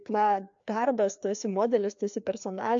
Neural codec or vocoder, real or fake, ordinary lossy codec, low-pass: codec, 16 kHz, 2 kbps, FreqCodec, larger model; fake; AAC, 64 kbps; 7.2 kHz